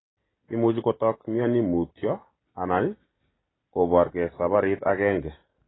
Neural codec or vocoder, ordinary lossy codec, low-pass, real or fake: none; AAC, 16 kbps; 7.2 kHz; real